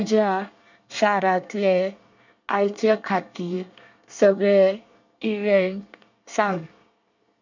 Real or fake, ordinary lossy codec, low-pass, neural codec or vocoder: fake; none; 7.2 kHz; codec, 24 kHz, 1 kbps, SNAC